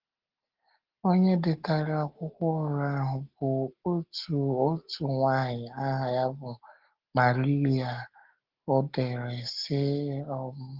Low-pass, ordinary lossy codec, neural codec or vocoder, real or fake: 5.4 kHz; Opus, 16 kbps; none; real